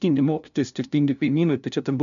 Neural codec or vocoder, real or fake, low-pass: codec, 16 kHz, 0.5 kbps, FunCodec, trained on LibriTTS, 25 frames a second; fake; 7.2 kHz